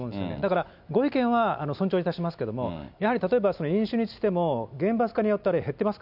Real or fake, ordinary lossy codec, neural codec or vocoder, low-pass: real; none; none; 5.4 kHz